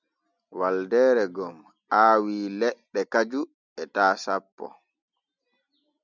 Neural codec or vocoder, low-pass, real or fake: none; 7.2 kHz; real